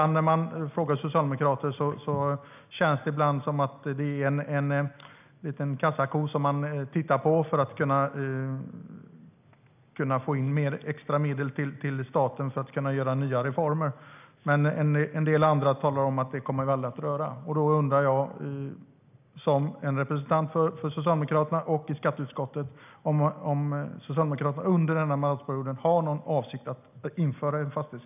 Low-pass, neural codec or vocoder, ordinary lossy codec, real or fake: 3.6 kHz; none; none; real